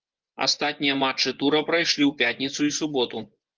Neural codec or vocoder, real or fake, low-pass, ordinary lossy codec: vocoder, 24 kHz, 100 mel bands, Vocos; fake; 7.2 kHz; Opus, 24 kbps